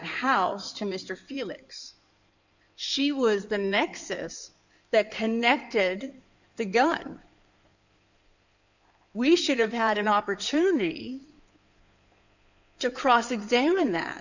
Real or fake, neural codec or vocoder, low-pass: fake; codec, 16 kHz in and 24 kHz out, 2.2 kbps, FireRedTTS-2 codec; 7.2 kHz